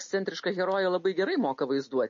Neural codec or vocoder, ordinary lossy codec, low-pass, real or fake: none; MP3, 32 kbps; 7.2 kHz; real